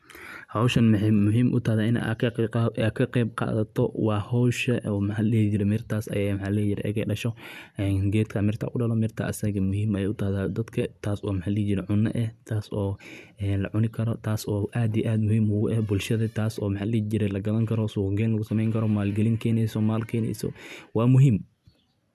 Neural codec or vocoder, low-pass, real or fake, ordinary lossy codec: none; 14.4 kHz; real; none